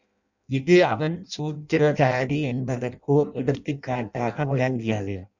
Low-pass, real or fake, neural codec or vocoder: 7.2 kHz; fake; codec, 16 kHz in and 24 kHz out, 0.6 kbps, FireRedTTS-2 codec